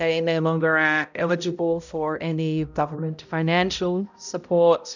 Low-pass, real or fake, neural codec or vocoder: 7.2 kHz; fake; codec, 16 kHz, 0.5 kbps, X-Codec, HuBERT features, trained on balanced general audio